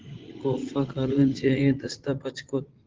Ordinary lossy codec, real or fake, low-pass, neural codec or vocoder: Opus, 16 kbps; fake; 7.2 kHz; vocoder, 44.1 kHz, 80 mel bands, Vocos